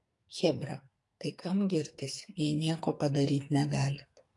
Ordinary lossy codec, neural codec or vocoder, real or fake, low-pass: AAC, 48 kbps; codec, 44.1 kHz, 2.6 kbps, SNAC; fake; 10.8 kHz